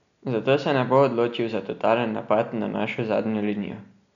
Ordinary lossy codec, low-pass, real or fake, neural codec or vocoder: none; 7.2 kHz; real; none